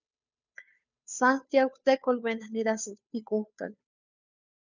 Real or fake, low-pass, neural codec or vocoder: fake; 7.2 kHz; codec, 16 kHz, 2 kbps, FunCodec, trained on Chinese and English, 25 frames a second